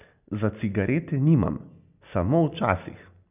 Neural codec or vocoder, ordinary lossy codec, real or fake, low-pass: none; none; real; 3.6 kHz